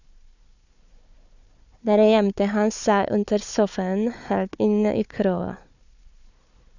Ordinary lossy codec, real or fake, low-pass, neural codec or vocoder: none; fake; 7.2 kHz; codec, 16 kHz, 4 kbps, FunCodec, trained on Chinese and English, 50 frames a second